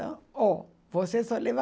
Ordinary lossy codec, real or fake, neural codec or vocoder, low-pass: none; real; none; none